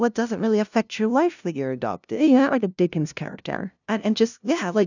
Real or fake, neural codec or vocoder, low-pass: fake; codec, 16 kHz, 0.5 kbps, FunCodec, trained on LibriTTS, 25 frames a second; 7.2 kHz